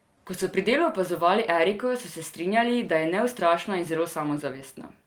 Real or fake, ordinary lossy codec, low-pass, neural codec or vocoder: real; Opus, 24 kbps; 19.8 kHz; none